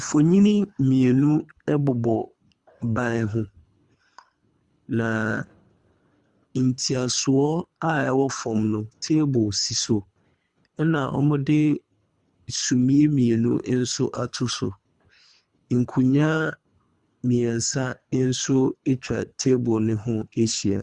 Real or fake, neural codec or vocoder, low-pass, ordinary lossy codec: fake; codec, 24 kHz, 3 kbps, HILCodec; 10.8 kHz; Opus, 64 kbps